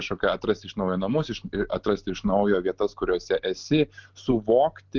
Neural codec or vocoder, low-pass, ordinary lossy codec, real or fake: none; 7.2 kHz; Opus, 32 kbps; real